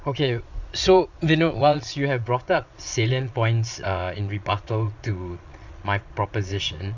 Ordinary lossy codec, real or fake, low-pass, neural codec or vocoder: none; fake; 7.2 kHz; vocoder, 22.05 kHz, 80 mel bands, Vocos